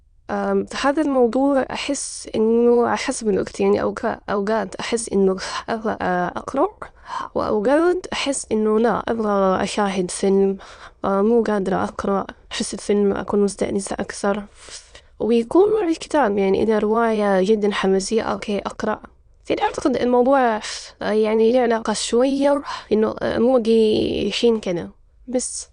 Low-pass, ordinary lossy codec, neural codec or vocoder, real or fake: 9.9 kHz; Opus, 64 kbps; autoencoder, 22.05 kHz, a latent of 192 numbers a frame, VITS, trained on many speakers; fake